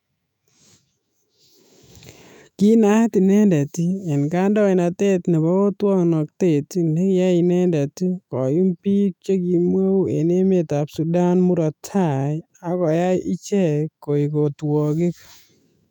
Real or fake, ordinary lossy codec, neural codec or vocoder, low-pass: fake; none; autoencoder, 48 kHz, 128 numbers a frame, DAC-VAE, trained on Japanese speech; 19.8 kHz